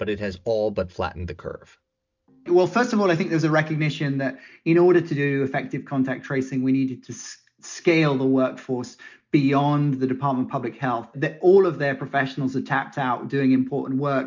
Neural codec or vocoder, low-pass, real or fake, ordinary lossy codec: none; 7.2 kHz; real; MP3, 64 kbps